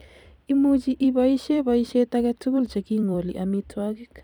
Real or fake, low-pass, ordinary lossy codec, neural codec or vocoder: fake; 19.8 kHz; none; vocoder, 44.1 kHz, 128 mel bands every 256 samples, BigVGAN v2